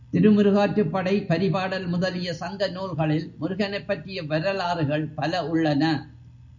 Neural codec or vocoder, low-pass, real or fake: none; 7.2 kHz; real